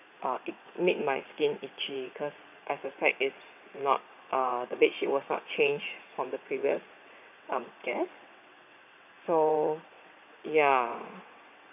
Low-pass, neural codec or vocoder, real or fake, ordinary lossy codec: 3.6 kHz; vocoder, 22.05 kHz, 80 mel bands, WaveNeXt; fake; none